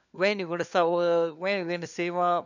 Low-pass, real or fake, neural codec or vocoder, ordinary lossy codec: 7.2 kHz; fake; codec, 16 kHz, 4 kbps, FunCodec, trained on LibriTTS, 50 frames a second; none